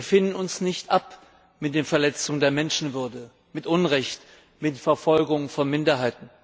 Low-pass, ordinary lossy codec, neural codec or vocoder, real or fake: none; none; none; real